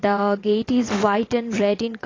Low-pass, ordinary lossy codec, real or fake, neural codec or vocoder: 7.2 kHz; AAC, 32 kbps; fake; vocoder, 22.05 kHz, 80 mel bands, WaveNeXt